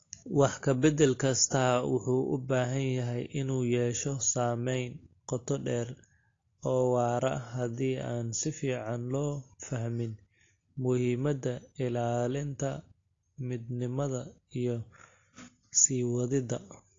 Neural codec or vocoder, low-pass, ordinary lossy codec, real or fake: none; 7.2 kHz; AAC, 32 kbps; real